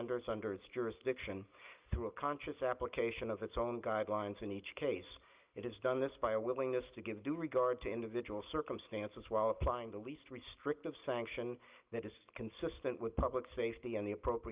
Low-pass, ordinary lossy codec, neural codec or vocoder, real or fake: 3.6 kHz; Opus, 24 kbps; none; real